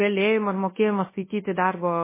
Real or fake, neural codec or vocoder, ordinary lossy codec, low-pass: fake; codec, 24 kHz, 0.9 kbps, WavTokenizer, large speech release; MP3, 16 kbps; 3.6 kHz